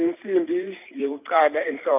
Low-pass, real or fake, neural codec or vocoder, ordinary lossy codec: 3.6 kHz; fake; vocoder, 44.1 kHz, 128 mel bands every 512 samples, BigVGAN v2; none